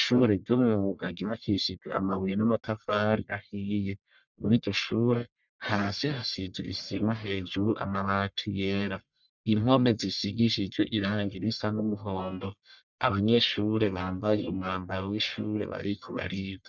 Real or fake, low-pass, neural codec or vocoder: fake; 7.2 kHz; codec, 44.1 kHz, 1.7 kbps, Pupu-Codec